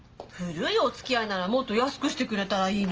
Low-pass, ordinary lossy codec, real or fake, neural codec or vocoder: 7.2 kHz; Opus, 24 kbps; real; none